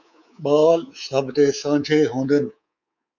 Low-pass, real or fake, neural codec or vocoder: 7.2 kHz; fake; codec, 24 kHz, 3.1 kbps, DualCodec